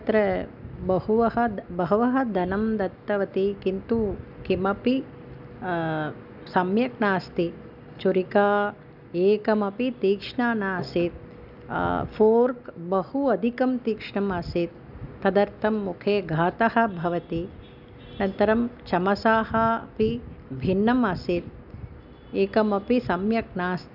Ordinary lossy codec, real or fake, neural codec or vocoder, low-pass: none; real; none; 5.4 kHz